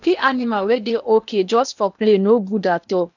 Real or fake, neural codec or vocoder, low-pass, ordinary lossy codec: fake; codec, 16 kHz in and 24 kHz out, 0.8 kbps, FocalCodec, streaming, 65536 codes; 7.2 kHz; none